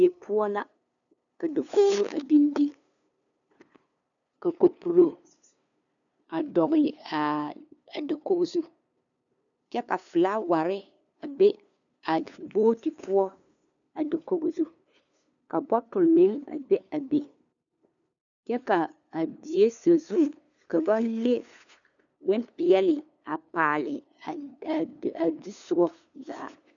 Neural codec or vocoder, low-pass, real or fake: codec, 16 kHz, 2 kbps, FunCodec, trained on LibriTTS, 25 frames a second; 7.2 kHz; fake